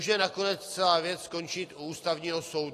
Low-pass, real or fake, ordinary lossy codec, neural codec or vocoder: 14.4 kHz; real; AAC, 48 kbps; none